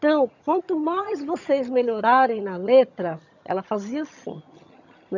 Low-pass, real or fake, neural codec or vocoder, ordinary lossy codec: 7.2 kHz; fake; vocoder, 22.05 kHz, 80 mel bands, HiFi-GAN; none